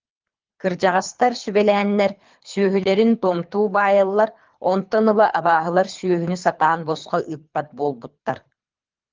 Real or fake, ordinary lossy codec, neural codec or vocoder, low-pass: fake; Opus, 32 kbps; codec, 24 kHz, 3 kbps, HILCodec; 7.2 kHz